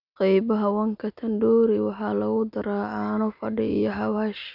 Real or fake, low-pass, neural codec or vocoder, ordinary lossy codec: real; 5.4 kHz; none; none